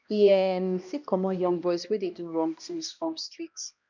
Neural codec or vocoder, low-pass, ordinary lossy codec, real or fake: codec, 16 kHz, 1 kbps, X-Codec, HuBERT features, trained on balanced general audio; 7.2 kHz; none; fake